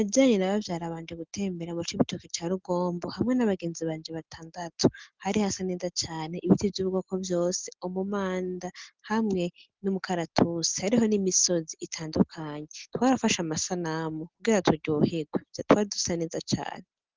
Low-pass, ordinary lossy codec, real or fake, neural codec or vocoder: 7.2 kHz; Opus, 16 kbps; real; none